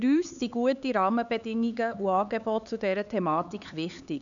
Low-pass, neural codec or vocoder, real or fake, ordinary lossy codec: 7.2 kHz; codec, 16 kHz, 4 kbps, X-Codec, HuBERT features, trained on LibriSpeech; fake; none